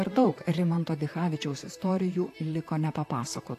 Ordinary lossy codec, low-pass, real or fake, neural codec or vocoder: AAC, 64 kbps; 14.4 kHz; fake; vocoder, 44.1 kHz, 128 mel bands, Pupu-Vocoder